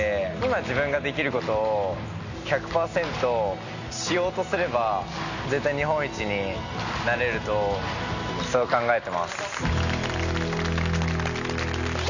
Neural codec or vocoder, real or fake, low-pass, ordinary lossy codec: none; real; 7.2 kHz; none